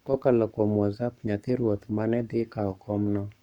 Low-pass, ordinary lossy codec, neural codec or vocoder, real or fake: 19.8 kHz; none; codec, 44.1 kHz, 7.8 kbps, Pupu-Codec; fake